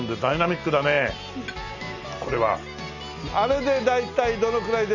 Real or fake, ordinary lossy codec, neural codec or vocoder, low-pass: real; none; none; 7.2 kHz